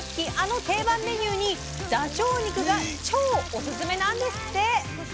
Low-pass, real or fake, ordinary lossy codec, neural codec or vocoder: none; real; none; none